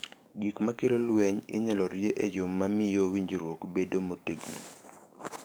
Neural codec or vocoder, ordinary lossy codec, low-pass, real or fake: codec, 44.1 kHz, 7.8 kbps, DAC; none; none; fake